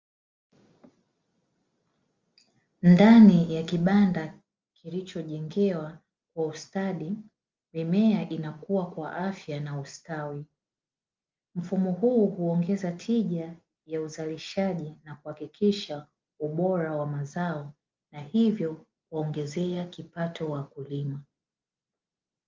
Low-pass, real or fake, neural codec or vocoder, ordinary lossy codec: 7.2 kHz; real; none; Opus, 32 kbps